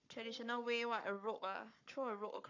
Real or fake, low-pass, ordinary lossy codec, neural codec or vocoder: fake; 7.2 kHz; none; codec, 44.1 kHz, 7.8 kbps, Pupu-Codec